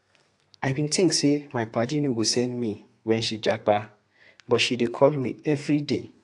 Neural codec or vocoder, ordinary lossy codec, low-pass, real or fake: codec, 32 kHz, 1.9 kbps, SNAC; AAC, 64 kbps; 10.8 kHz; fake